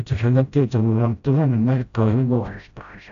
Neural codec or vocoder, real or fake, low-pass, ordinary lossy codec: codec, 16 kHz, 0.5 kbps, FreqCodec, smaller model; fake; 7.2 kHz; none